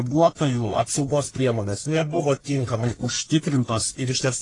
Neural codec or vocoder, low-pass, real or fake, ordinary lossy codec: codec, 44.1 kHz, 1.7 kbps, Pupu-Codec; 10.8 kHz; fake; AAC, 32 kbps